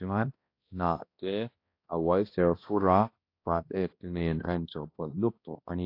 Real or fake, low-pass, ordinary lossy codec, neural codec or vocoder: fake; 5.4 kHz; AAC, 32 kbps; codec, 16 kHz, 1 kbps, X-Codec, HuBERT features, trained on balanced general audio